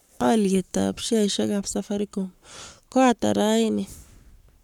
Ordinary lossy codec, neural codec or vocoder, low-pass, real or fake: none; codec, 44.1 kHz, 7.8 kbps, Pupu-Codec; 19.8 kHz; fake